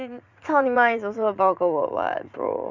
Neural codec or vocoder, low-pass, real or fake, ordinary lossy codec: vocoder, 44.1 kHz, 80 mel bands, Vocos; 7.2 kHz; fake; none